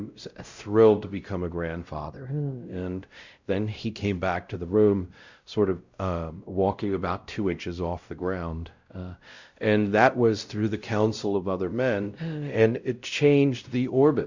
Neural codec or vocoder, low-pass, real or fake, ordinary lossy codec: codec, 16 kHz, 0.5 kbps, X-Codec, WavLM features, trained on Multilingual LibriSpeech; 7.2 kHz; fake; Opus, 64 kbps